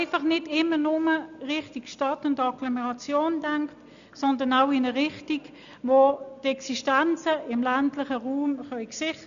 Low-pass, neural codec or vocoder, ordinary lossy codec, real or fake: 7.2 kHz; none; none; real